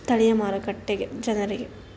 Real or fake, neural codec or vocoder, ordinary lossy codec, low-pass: real; none; none; none